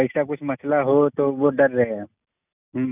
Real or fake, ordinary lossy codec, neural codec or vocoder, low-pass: real; none; none; 3.6 kHz